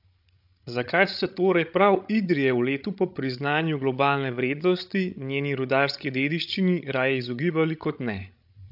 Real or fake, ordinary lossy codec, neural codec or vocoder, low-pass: fake; AAC, 48 kbps; codec, 16 kHz, 16 kbps, FreqCodec, larger model; 5.4 kHz